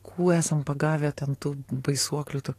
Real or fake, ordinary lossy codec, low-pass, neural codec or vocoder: fake; AAC, 48 kbps; 14.4 kHz; codec, 44.1 kHz, 7.8 kbps, DAC